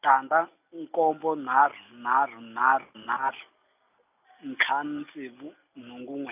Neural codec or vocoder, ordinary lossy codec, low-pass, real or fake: none; none; 3.6 kHz; real